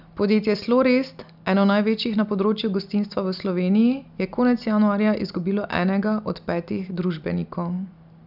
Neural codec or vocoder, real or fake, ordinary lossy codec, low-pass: none; real; none; 5.4 kHz